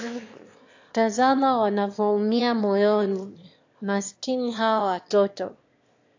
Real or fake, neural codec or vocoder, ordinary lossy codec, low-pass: fake; autoencoder, 22.05 kHz, a latent of 192 numbers a frame, VITS, trained on one speaker; AAC, 48 kbps; 7.2 kHz